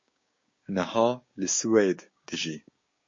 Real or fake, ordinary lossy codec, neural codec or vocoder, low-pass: fake; MP3, 32 kbps; codec, 16 kHz, 6 kbps, DAC; 7.2 kHz